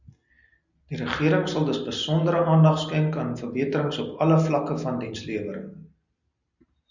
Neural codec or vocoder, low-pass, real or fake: none; 7.2 kHz; real